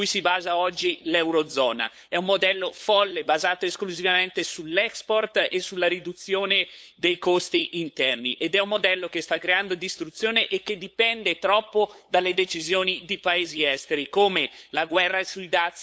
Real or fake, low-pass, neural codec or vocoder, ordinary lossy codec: fake; none; codec, 16 kHz, 8 kbps, FunCodec, trained on LibriTTS, 25 frames a second; none